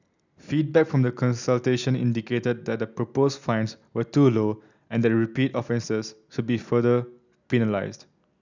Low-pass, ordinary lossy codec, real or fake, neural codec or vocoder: 7.2 kHz; none; real; none